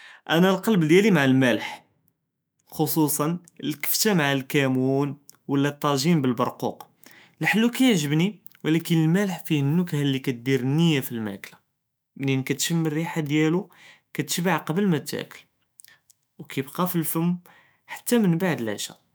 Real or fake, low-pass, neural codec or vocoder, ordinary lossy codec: fake; none; autoencoder, 48 kHz, 128 numbers a frame, DAC-VAE, trained on Japanese speech; none